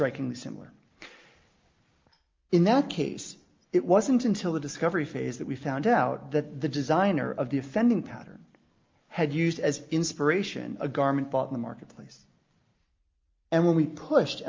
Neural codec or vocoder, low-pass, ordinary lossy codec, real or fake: none; 7.2 kHz; Opus, 24 kbps; real